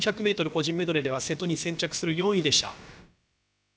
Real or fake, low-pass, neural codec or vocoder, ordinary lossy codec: fake; none; codec, 16 kHz, about 1 kbps, DyCAST, with the encoder's durations; none